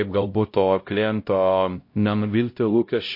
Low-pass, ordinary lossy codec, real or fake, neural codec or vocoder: 5.4 kHz; MP3, 32 kbps; fake; codec, 16 kHz, 0.5 kbps, X-Codec, HuBERT features, trained on LibriSpeech